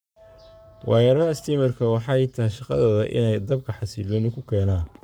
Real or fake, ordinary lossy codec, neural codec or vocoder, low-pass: fake; none; codec, 44.1 kHz, 7.8 kbps, Pupu-Codec; none